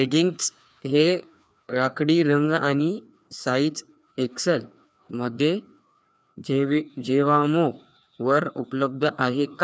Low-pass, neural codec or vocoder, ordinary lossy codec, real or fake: none; codec, 16 kHz, 2 kbps, FreqCodec, larger model; none; fake